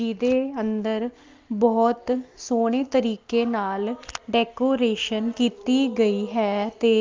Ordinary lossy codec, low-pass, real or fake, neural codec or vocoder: Opus, 24 kbps; 7.2 kHz; real; none